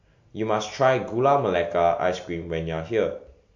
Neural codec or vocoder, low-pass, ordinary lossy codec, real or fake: none; 7.2 kHz; MP3, 48 kbps; real